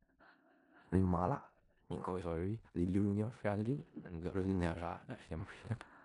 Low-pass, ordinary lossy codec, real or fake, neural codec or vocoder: 10.8 kHz; none; fake; codec, 16 kHz in and 24 kHz out, 0.4 kbps, LongCat-Audio-Codec, four codebook decoder